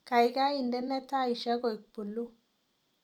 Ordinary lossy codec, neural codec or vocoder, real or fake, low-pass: none; vocoder, 44.1 kHz, 128 mel bands every 256 samples, BigVGAN v2; fake; 19.8 kHz